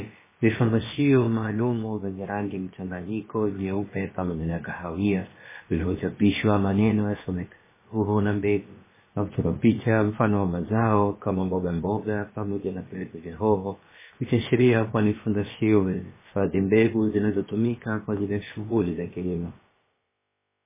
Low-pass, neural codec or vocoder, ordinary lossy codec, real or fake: 3.6 kHz; codec, 16 kHz, about 1 kbps, DyCAST, with the encoder's durations; MP3, 16 kbps; fake